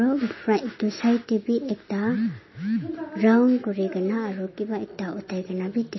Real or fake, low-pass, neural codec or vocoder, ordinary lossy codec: real; 7.2 kHz; none; MP3, 24 kbps